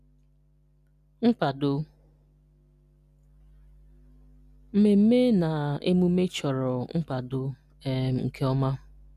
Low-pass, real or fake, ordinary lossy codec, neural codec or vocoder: 14.4 kHz; real; none; none